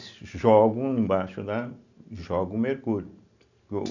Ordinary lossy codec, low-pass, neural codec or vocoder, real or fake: none; 7.2 kHz; none; real